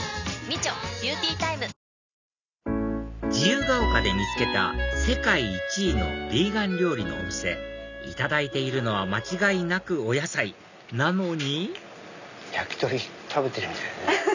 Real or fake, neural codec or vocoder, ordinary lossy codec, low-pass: real; none; none; 7.2 kHz